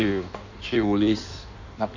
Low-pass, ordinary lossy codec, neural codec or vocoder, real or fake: 7.2 kHz; none; codec, 16 kHz in and 24 kHz out, 1.1 kbps, FireRedTTS-2 codec; fake